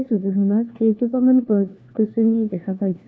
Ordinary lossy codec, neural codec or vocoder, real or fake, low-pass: none; codec, 16 kHz, 1 kbps, FunCodec, trained on LibriTTS, 50 frames a second; fake; none